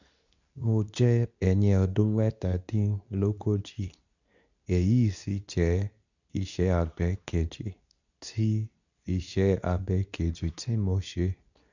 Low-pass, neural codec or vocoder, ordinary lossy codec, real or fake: 7.2 kHz; codec, 24 kHz, 0.9 kbps, WavTokenizer, medium speech release version 2; none; fake